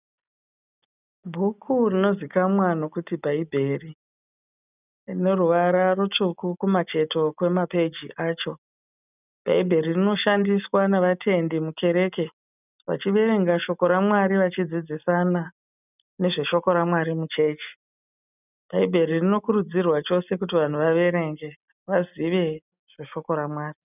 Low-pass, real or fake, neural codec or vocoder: 3.6 kHz; real; none